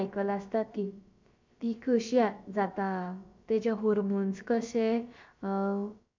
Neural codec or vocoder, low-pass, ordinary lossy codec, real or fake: codec, 16 kHz, about 1 kbps, DyCAST, with the encoder's durations; 7.2 kHz; MP3, 64 kbps; fake